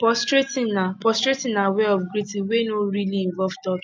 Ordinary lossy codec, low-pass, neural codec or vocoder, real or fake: none; none; none; real